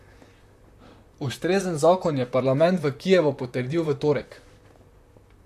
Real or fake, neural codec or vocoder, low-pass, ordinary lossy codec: fake; vocoder, 44.1 kHz, 128 mel bands, Pupu-Vocoder; 14.4 kHz; MP3, 64 kbps